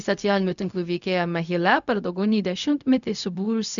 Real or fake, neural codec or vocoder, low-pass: fake; codec, 16 kHz, 0.4 kbps, LongCat-Audio-Codec; 7.2 kHz